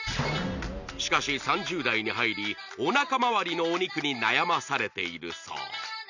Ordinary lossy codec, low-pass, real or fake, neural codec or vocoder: none; 7.2 kHz; real; none